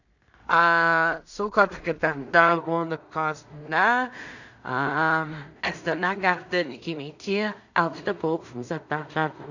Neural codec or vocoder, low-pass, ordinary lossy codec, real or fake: codec, 16 kHz in and 24 kHz out, 0.4 kbps, LongCat-Audio-Codec, two codebook decoder; 7.2 kHz; none; fake